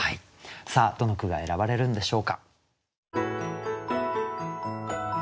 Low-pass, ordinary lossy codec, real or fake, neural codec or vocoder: none; none; real; none